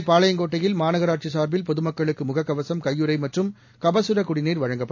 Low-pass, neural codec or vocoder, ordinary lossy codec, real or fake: 7.2 kHz; none; AAC, 48 kbps; real